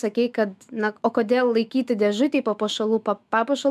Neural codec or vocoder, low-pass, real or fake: autoencoder, 48 kHz, 128 numbers a frame, DAC-VAE, trained on Japanese speech; 14.4 kHz; fake